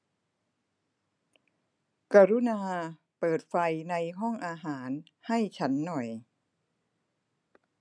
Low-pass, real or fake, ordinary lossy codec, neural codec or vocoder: 9.9 kHz; real; none; none